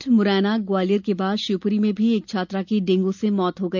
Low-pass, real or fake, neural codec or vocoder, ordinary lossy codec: 7.2 kHz; real; none; none